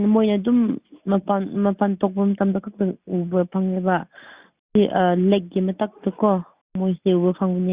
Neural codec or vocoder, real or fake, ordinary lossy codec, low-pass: none; real; Opus, 64 kbps; 3.6 kHz